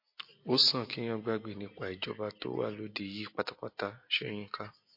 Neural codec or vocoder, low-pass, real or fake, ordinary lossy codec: none; 5.4 kHz; real; MP3, 32 kbps